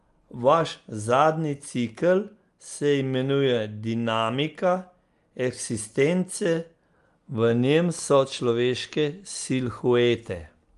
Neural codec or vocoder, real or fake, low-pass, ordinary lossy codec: none; real; 10.8 kHz; Opus, 32 kbps